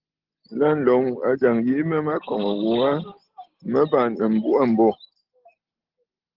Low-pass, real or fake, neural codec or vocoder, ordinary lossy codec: 5.4 kHz; real; none; Opus, 16 kbps